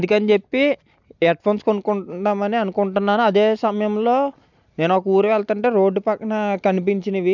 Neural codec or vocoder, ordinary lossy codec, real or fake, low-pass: none; none; real; 7.2 kHz